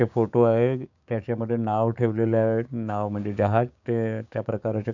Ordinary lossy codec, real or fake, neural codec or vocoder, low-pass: none; fake; codec, 16 kHz, 6 kbps, DAC; 7.2 kHz